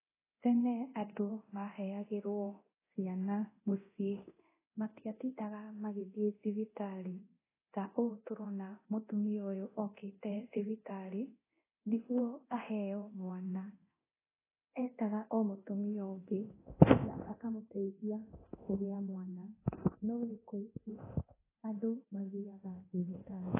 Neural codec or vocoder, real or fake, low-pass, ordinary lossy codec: codec, 24 kHz, 0.9 kbps, DualCodec; fake; 3.6 kHz; AAC, 16 kbps